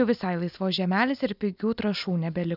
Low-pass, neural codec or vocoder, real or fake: 5.4 kHz; none; real